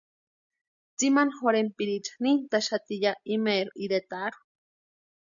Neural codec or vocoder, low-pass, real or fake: none; 7.2 kHz; real